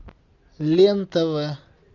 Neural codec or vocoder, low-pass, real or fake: none; 7.2 kHz; real